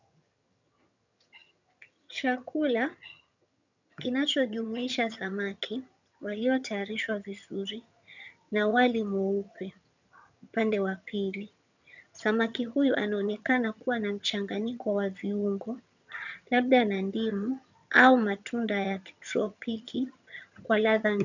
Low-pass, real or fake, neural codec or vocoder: 7.2 kHz; fake; vocoder, 22.05 kHz, 80 mel bands, HiFi-GAN